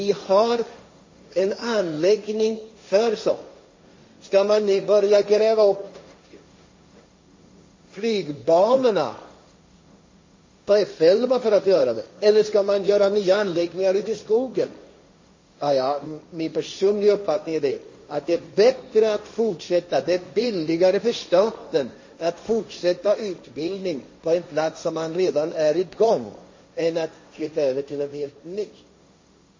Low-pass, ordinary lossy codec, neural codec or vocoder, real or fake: 7.2 kHz; MP3, 32 kbps; codec, 16 kHz, 1.1 kbps, Voila-Tokenizer; fake